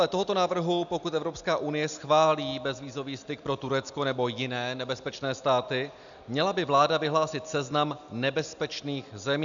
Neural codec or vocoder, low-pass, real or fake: none; 7.2 kHz; real